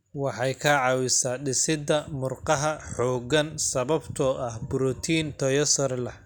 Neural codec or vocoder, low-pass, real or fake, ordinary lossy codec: none; none; real; none